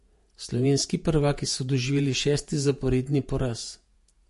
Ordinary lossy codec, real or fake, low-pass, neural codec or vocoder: MP3, 48 kbps; fake; 14.4 kHz; vocoder, 48 kHz, 128 mel bands, Vocos